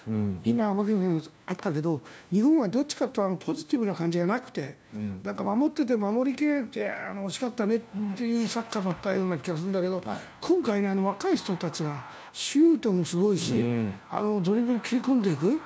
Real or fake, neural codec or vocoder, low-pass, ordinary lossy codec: fake; codec, 16 kHz, 1 kbps, FunCodec, trained on LibriTTS, 50 frames a second; none; none